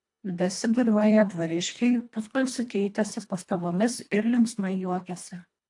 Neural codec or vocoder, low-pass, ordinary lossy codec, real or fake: codec, 24 kHz, 1.5 kbps, HILCodec; 10.8 kHz; AAC, 64 kbps; fake